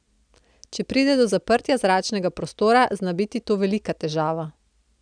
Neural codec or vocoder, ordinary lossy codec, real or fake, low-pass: none; none; real; 9.9 kHz